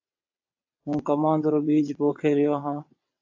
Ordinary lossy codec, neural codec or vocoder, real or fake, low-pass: AAC, 48 kbps; vocoder, 22.05 kHz, 80 mel bands, WaveNeXt; fake; 7.2 kHz